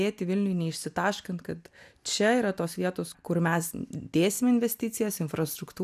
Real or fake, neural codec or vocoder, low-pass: real; none; 14.4 kHz